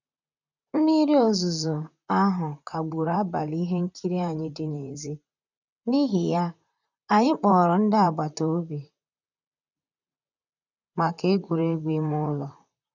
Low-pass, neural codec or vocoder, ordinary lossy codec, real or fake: 7.2 kHz; vocoder, 44.1 kHz, 128 mel bands, Pupu-Vocoder; none; fake